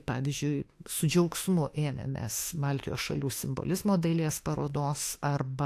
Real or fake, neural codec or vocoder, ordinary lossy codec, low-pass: fake; autoencoder, 48 kHz, 32 numbers a frame, DAC-VAE, trained on Japanese speech; AAC, 64 kbps; 14.4 kHz